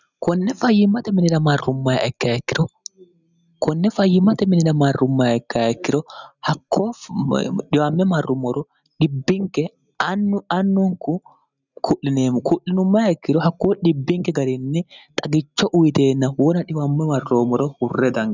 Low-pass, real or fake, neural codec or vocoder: 7.2 kHz; real; none